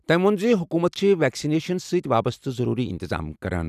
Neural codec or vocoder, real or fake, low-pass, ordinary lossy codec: none; real; 14.4 kHz; none